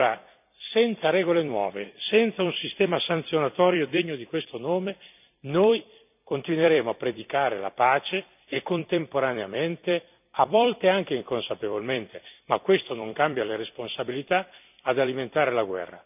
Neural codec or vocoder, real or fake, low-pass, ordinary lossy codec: vocoder, 44.1 kHz, 128 mel bands every 256 samples, BigVGAN v2; fake; 3.6 kHz; none